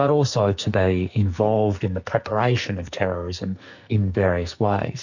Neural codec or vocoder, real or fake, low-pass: codec, 44.1 kHz, 2.6 kbps, SNAC; fake; 7.2 kHz